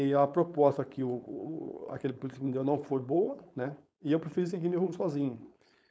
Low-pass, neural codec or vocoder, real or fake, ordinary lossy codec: none; codec, 16 kHz, 4.8 kbps, FACodec; fake; none